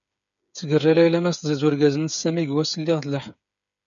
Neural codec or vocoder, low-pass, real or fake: codec, 16 kHz, 8 kbps, FreqCodec, smaller model; 7.2 kHz; fake